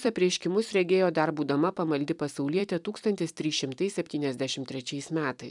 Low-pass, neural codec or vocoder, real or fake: 10.8 kHz; vocoder, 44.1 kHz, 128 mel bands every 256 samples, BigVGAN v2; fake